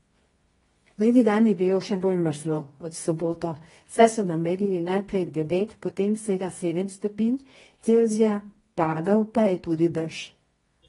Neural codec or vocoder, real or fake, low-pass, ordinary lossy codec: codec, 24 kHz, 0.9 kbps, WavTokenizer, medium music audio release; fake; 10.8 kHz; AAC, 32 kbps